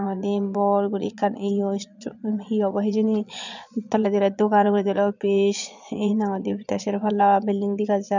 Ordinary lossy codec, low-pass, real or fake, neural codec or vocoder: none; 7.2 kHz; fake; vocoder, 22.05 kHz, 80 mel bands, Vocos